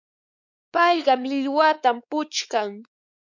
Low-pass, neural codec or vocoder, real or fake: 7.2 kHz; codec, 16 kHz, 4 kbps, X-Codec, WavLM features, trained on Multilingual LibriSpeech; fake